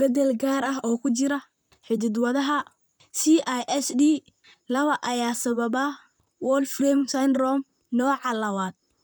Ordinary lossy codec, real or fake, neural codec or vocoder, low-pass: none; fake; vocoder, 44.1 kHz, 128 mel bands, Pupu-Vocoder; none